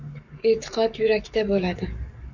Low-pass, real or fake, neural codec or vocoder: 7.2 kHz; fake; codec, 44.1 kHz, 7.8 kbps, Pupu-Codec